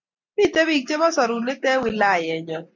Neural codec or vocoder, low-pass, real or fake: none; 7.2 kHz; real